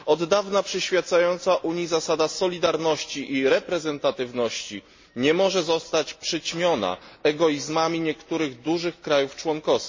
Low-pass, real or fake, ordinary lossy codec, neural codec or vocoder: 7.2 kHz; real; MP3, 32 kbps; none